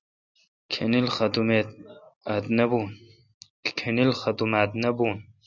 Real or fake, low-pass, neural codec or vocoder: real; 7.2 kHz; none